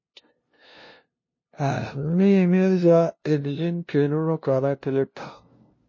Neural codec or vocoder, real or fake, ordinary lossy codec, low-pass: codec, 16 kHz, 0.5 kbps, FunCodec, trained on LibriTTS, 25 frames a second; fake; MP3, 32 kbps; 7.2 kHz